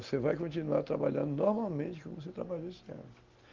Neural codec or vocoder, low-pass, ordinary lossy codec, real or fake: none; 7.2 kHz; Opus, 32 kbps; real